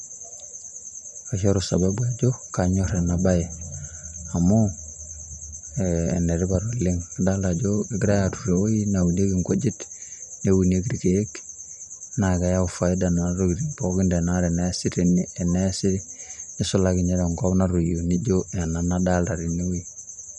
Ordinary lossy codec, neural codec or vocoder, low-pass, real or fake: none; none; none; real